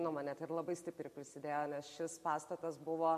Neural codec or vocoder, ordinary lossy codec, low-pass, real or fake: none; MP3, 64 kbps; 14.4 kHz; real